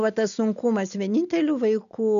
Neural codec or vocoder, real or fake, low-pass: none; real; 7.2 kHz